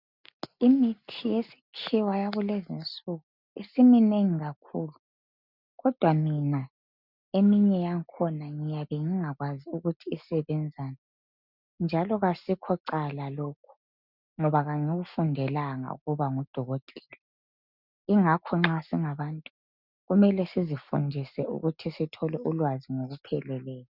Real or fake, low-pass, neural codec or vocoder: real; 5.4 kHz; none